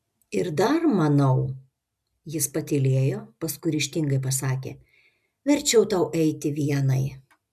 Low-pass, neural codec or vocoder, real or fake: 14.4 kHz; none; real